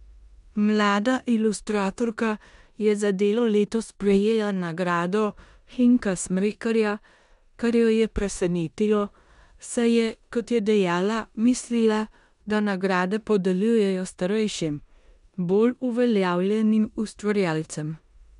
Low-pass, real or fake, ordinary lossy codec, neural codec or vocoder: 10.8 kHz; fake; none; codec, 16 kHz in and 24 kHz out, 0.9 kbps, LongCat-Audio-Codec, four codebook decoder